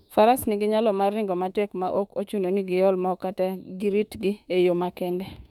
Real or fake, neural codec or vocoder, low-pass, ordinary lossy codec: fake; autoencoder, 48 kHz, 32 numbers a frame, DAC-VAE, trained on Japanese speech; 19.8 kHz; none